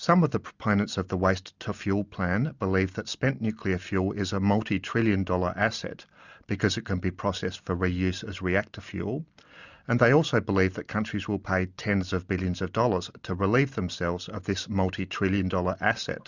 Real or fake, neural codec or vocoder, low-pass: real; none; 7.2 kHz